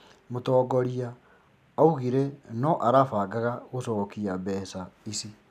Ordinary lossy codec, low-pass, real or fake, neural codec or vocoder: none; 14.4 kHz; real; none